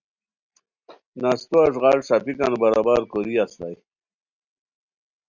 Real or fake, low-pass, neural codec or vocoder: real; 7.2 kHz; none